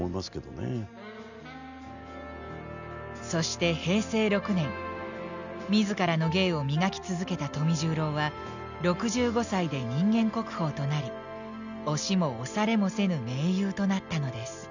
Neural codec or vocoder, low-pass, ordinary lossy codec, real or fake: none; 7.2 kHz; none; real